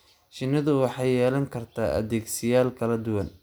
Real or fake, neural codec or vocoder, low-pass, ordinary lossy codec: real; none; none; none